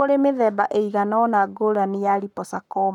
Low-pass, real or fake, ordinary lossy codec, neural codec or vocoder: 19.8 kHz; fake; none; codec, 44.1 kHz, 7.8 kbps, Pupu-Codec